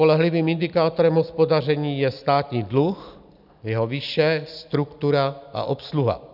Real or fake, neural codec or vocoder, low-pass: real; none; 5.4 kHz